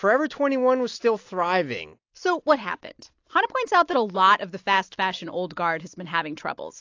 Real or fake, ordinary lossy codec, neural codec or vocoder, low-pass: real; AAC, 48 kbps; none; 7.2 kHz